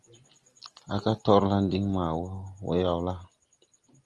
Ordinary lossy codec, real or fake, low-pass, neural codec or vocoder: Opus, 24 kbps; real; 10.8 kHz; none